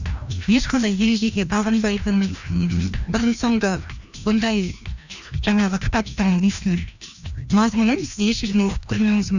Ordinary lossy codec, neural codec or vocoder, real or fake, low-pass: none; codec, 16 kHz, 1 kbps, FreqCodec, larger model; fake; 7.2 kHz